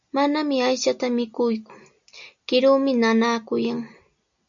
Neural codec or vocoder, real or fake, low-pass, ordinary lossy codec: none; real; 7.2 kHz; MP3, 96 kbps